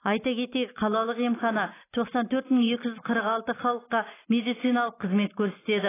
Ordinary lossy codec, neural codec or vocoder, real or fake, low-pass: AAC, 16 kbps; none; real; 3.6 kHz